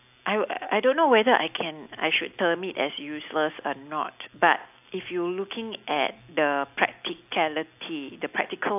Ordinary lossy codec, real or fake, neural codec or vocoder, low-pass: AAC, 32 kbps; real; none; 3.6 kHz